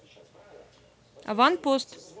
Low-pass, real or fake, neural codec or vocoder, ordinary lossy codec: none; real; none; none